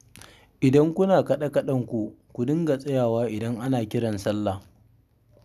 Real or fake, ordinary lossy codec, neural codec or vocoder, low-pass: real; none; none; 14.4 kHz